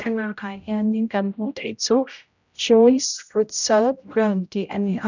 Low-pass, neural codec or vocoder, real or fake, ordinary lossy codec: 7.2 kHz; codec, 16 kHz, 0.5 kbps, X-Codec, HuBERT features, trained on general audio; fake; none